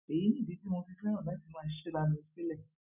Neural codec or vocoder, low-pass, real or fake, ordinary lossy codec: none; 3.6 kHz; real; none